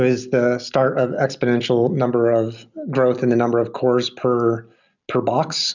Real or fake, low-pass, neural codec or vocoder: real; 7.2 kHz; none